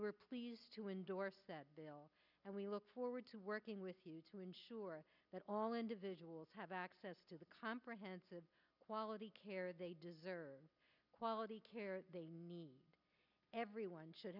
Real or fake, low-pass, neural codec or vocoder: real; 5.4 kHz; none